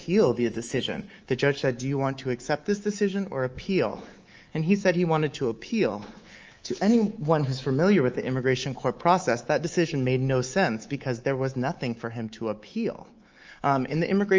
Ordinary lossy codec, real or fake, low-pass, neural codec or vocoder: Opus, 24 kbps; fake; 7.2 kHz; codec, 24 kHz, 3.1 kbps, DualCodec